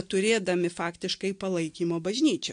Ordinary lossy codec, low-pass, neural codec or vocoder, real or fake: AAC, 64 kbps; 9.9 kHz; none; real